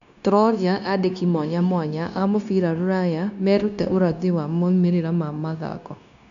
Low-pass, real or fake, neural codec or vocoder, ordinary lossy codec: 7.2 kHz; fake; codec, 16 kHz, 0.9 kbps, LongCat-Audio-Codec; MP3, 96 kbps